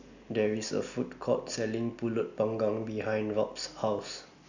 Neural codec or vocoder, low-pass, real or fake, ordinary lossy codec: none; 7.2 kHz; real; none